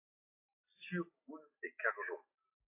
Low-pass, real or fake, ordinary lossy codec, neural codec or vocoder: 3.6 kHz; real; AAC, 32 kbps; none